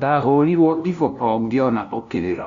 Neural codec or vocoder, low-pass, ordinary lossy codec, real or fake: codec, 16 kHz, 0.5 kbps, FunCodec, trained on LibriTTS, 25 frames a second; 7.2 kHz; none; fake